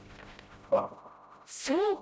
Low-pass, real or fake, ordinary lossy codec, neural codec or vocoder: none; fake; none; codec, 16 kHz, 0.5 kbps, FreqCodec, smaller model